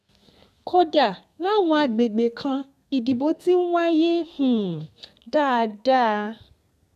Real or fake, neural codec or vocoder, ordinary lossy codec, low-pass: fake; codec, 32 kHz, 1.9 kbps, SNAC; none; 14.4 kHz